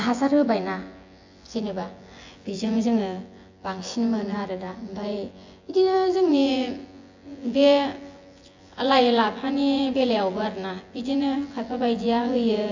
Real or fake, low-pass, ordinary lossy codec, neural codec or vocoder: fake; 7.2 kHz; AAC, 48 kbps; vocoder, 24 kHz, 100 mel bands, Vocos